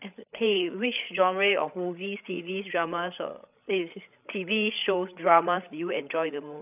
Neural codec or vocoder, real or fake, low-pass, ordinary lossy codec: codec, 16 kHz, 8 kbps, FreqCodec, larger model; fake; 3.6 kHz; none